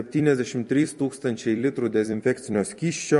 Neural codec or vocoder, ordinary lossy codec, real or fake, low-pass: vocoder, 44.1 kHz, 128 mel bands every 256 samples, BigVGAN v2; MP3, 48 kbps; fake; 14.4 kHz